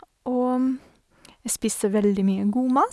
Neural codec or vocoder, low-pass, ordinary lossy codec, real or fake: none; none; none; real